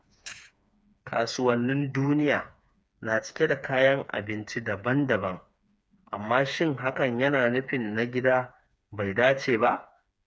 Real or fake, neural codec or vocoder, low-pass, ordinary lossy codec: fake; codec, 16 kHz, 4 kbps, FreqCodec, smaller model; none; none